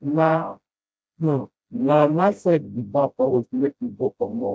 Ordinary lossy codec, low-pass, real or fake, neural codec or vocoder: none; none; fake; codec, 16 kHz, 0.5 kbps, FreqCodec, smaller model